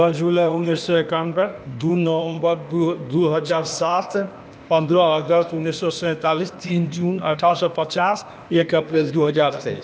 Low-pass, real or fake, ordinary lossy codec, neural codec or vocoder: none; fake; none; codec, 16 kHz, 0.8 kbps, ZipCodec